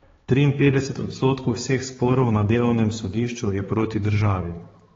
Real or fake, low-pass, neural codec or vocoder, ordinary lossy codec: fake; 7.2 kHz; codec, 16 kHz, 4 kbps, X-Codec, HuBERT features, trained on balanced general audio; AAC, 24 kbps